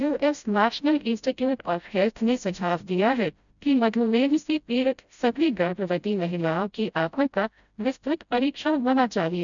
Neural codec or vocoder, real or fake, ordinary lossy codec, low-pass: codec, 16 kHz, 0.5 kbps, FreqCodec, smaller model; fake; none; 7.2 kHz